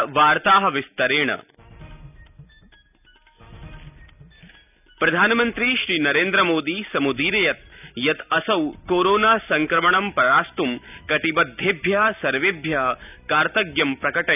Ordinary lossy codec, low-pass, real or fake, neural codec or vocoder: none; 3.6 kHz; real; none